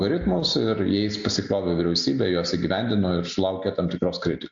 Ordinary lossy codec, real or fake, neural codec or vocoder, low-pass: MP3, 48 kbps; real; none; 7.2 kHz